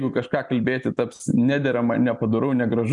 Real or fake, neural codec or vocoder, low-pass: real; none; 10.8 kHz